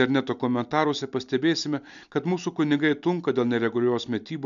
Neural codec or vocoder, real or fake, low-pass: none; real; 7.2 kHz